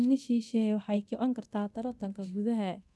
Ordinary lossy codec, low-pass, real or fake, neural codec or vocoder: none; none; fake; codec, 24 kHz, 0.9 kbps, DualCodec